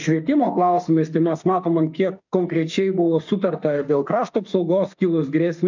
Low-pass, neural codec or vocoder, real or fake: 7.2 kHz; autoencoder, 48 kHz, 32 numbers a frame, DAC-VAE, trained on Japanese speech; fake